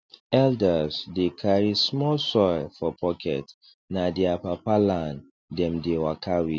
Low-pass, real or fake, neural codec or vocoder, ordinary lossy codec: none; real; none; none